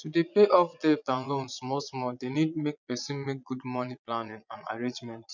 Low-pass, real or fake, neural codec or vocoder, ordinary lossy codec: 7.2 kHz; fake; vocoder, 22.05 kHz, 80 mel bands, Vocos; none